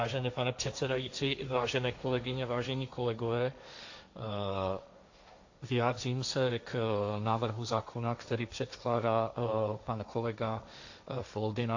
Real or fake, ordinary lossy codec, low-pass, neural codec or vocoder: fake; AAC, 48 kbps; 7.2 kHz; codec, 16 kHz, 1.1 kbps, Voila-Tokenizer